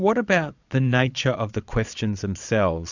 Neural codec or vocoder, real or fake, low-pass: vocoder, 22.05 kHz, 80 mel bands, Vocos; fake; 7.2 kHz